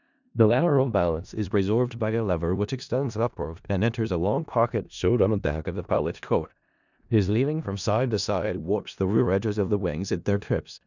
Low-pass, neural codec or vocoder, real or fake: 7.2 kHz; codec, 16 kHz in and 24 kHz out, 0.4 kbps, LongCat-Audio-Codec, four codebook decoder; fake